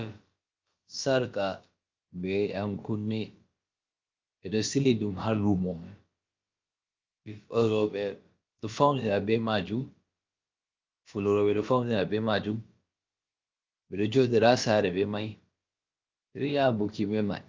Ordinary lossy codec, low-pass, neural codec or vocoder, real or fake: Opus, 24 kbps; 7.2 kHz; codec, 16 kHz, about 1 kbps, DyCAST, with the encoder's durations; fake